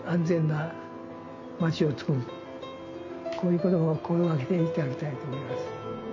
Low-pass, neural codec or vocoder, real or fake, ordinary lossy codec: 7.2 kHz; none; real; MP3, 48 kbps